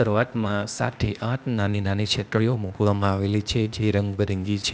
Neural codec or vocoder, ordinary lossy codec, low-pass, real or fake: codec, 16 kHz, 0.8 kbps, ZipCodec; none; none; fake